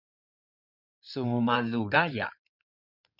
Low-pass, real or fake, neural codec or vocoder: 5.4 kHz; fake; codec, 16 kHz in and 24 kHz out, 2.2 kbps, FireRedTTS-2 codec